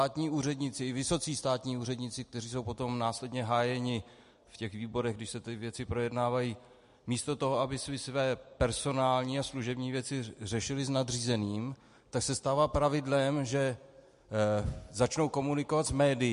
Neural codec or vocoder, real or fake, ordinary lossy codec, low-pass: none; real; MP3, 48 kbps; 10.8 kHz